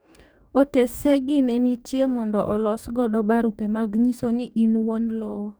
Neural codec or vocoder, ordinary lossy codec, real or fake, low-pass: codec, 44.1 kHz, 2.6 kbps, DAC; none; fake; none